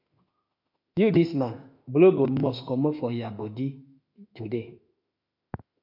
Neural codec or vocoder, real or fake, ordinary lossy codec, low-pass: autoencoder, 48 kHz, 32 numbers a frame, DAC-VAE, trained on Japanese speech; fake; MP3, 48 kbps; 5.4 kHz